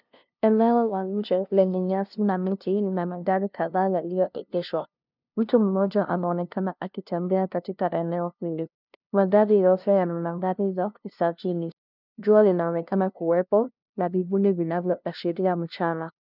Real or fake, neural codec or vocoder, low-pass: fake; codec, 16 kHz, 0.5 kbps, FunCodec, trained on LibriTTS, 25 frames a second; 5.4 kHz